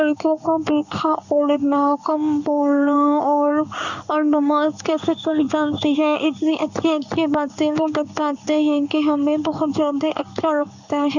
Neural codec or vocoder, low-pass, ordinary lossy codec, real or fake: codec, 16 kHz in and 24 kHz out, 1 kbps, XY-Tokenizer; 7.2 kHz; none; fake